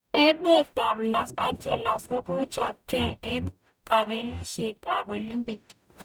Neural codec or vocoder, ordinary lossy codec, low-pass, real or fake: codec, 44.1 kHz, 0.9 kbps, DAC; none; none; fake